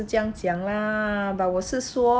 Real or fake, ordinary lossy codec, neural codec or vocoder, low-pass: real; none; none; none